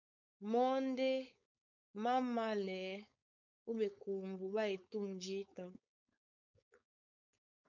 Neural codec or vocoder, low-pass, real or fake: codec, 16 kHz, 4.8 kbps, FACodec; 7.2 kHz; fake